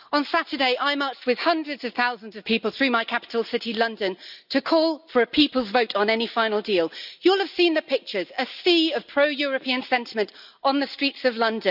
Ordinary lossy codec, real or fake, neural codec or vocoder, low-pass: none; real; none; 5.4 kHz